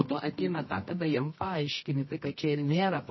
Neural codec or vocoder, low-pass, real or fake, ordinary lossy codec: codec, 24 kHz, 0.9 kbps, WavTokenizer, medium music audio release; 7.2 kHz; fake; MP3, 24 kbps